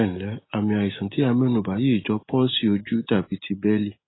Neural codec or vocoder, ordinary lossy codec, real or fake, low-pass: none; AAC, 16 kbps; real; 7.2 kHz